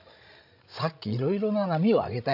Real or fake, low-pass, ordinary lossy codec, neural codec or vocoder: fake; 5.4 kHz; AAC, 48 kbps; codec, 16 kHz, 16 kbps, FreqCodec, larger model